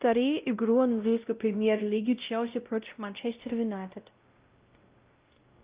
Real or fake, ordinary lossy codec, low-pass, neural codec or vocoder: fake; Opus, 24 kbps; 3.6 kHz; codec, 16 kHz, 0.5 kbps, X-Codec, WavLM features, trained on Multilingual LibriSpeech